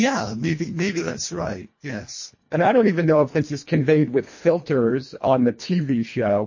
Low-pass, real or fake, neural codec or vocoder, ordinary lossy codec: 7.2 kHz; fake; codec, 24 kHz, 1.5 kbps, HILCodec; MP3, 32 kbps